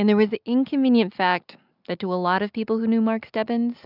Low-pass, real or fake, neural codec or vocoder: 5.4 kHz; real; none